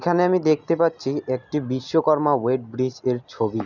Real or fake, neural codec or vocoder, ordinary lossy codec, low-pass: real; none; none; 7.2 kHz